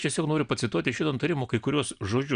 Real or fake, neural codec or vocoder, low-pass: real; none; 9.9 kHz